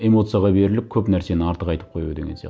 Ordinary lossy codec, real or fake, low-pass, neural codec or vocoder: none; real; none; none